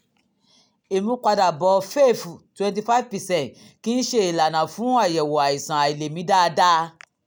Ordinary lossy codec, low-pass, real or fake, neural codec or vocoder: none; none; real; none